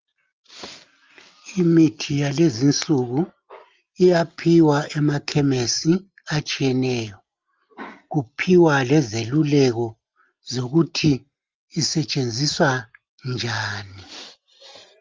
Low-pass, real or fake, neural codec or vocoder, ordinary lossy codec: 7.2 kHz; real; none; Opus, 24 kbps